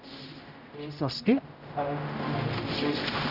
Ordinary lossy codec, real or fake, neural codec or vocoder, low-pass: none; fake; codec, 16 kHz, 0.5 kbps, X-Codec, HuBERT features, trained on general audio; 5.4 kHz